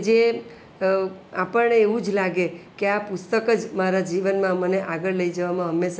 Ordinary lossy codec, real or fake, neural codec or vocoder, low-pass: none; real; none; none